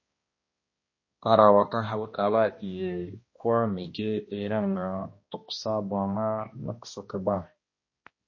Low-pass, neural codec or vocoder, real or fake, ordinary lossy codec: 7.2 kHz; codec, 16 kHz, 1 kbps, X-Codec, HuBERT features, trained on balanced general audio; fake; MP3, 32 kbps